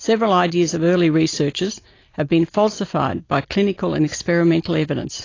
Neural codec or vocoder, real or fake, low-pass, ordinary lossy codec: none; real; 7.2 kHz; AAC, 32 kbps